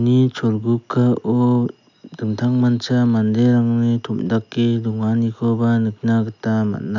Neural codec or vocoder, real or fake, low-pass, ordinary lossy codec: none; real; 7.2 kHz; none